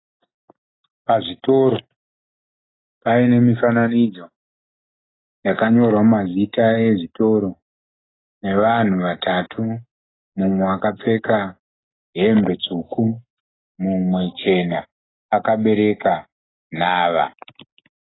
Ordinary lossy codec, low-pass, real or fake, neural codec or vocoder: AAC, 16 kbps; 7.2 kHz; real; none